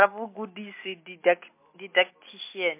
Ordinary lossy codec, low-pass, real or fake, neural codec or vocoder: MP3, 24 kbps; 3.6 kHz; real; none